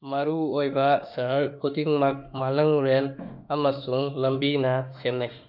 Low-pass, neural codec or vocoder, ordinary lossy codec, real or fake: 5.4 kHz; autoencoder, 48 kHz, 32 numbers a frame, DAC-VAE, trained on Japanese speech; none; fake